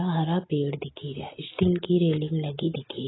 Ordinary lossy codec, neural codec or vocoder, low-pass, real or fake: AAC, 16 kbps; none; 7.2 kHz; real